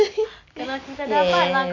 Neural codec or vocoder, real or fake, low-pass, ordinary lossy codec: none; real; 7.2 kHz; MP3, 64 kbps